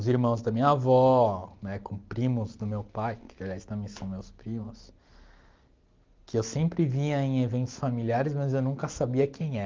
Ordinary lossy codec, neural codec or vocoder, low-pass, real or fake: Opus, 32 kbps; none; 7.2 kHz; real